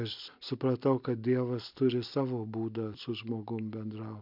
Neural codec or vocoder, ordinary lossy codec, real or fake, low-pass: none; MP3, 48 kbps; real; 5.4 kHz